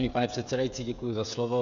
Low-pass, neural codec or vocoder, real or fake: 7.2 kHz; codec, 16 kHz, 16 kbps, FreqCodec, smaller model; fake